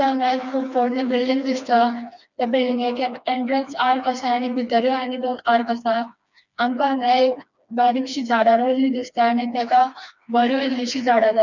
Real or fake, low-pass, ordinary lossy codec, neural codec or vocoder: fake; 7.2 kHz; none; codec, 16 kHz, 2 kbps, FreqCodec, smaller model